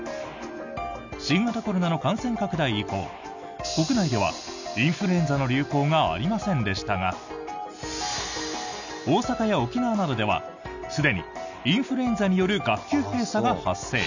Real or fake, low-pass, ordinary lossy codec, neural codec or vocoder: real; 7.2 kHz; none; none